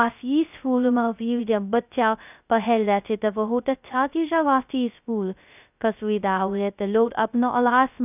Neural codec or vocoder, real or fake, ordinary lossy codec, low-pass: codec, 16 kHz, 0.2 kbps, FocalCodec; fake; none; 3.6 kHz